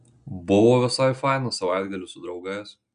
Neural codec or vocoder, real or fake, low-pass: none; real; 9.9 kHz